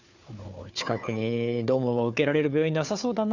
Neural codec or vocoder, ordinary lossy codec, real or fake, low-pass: codec, 16 kHz, 4 kbps, FunCodec, trained on Chinese and English, 50 frames a second; none; fake; 7.2 kHz